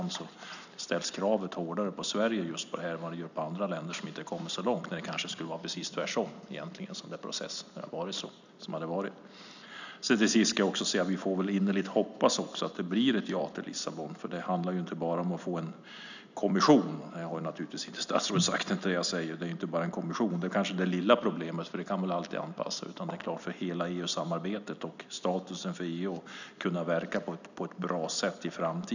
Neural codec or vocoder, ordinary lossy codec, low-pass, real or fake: none; none; 7.2 kHz; real